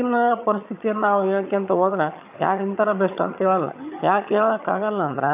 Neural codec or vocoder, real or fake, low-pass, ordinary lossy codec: vocoder, 22.05 kHz, 80 mel bands, HiFi-GAN; fake; 3.6 kHz; AAC, 32 kbps